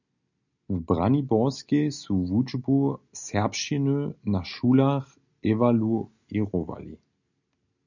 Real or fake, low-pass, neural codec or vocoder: real; 7.2 kHz; none